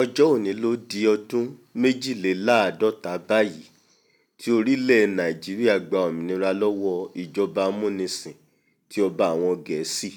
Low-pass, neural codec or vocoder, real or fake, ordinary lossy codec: 19.8 kHz; none; real; none